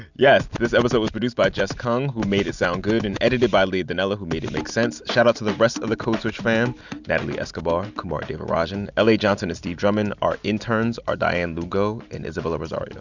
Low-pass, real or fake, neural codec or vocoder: 7.2 kHz; real; none